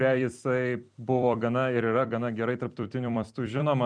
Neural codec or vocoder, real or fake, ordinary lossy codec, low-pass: vocoder, 44.1 kHz, 128 mel bands every 256 samples, BigVGAN v2; fake; MP3, 96 kbps; 9.9 kHz